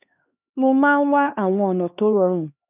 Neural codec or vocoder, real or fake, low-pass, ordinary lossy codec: codec, 16 kHz, 2 kbps, X-Codec, HuBERT features, trained on LibriSpeech; fake; 3.6 kHz; none